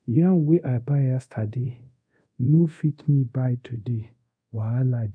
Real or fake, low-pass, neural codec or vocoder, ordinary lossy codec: fake; 9.9 kHz; codec, 24 kHz, 0.5 kbps, DualCodec; none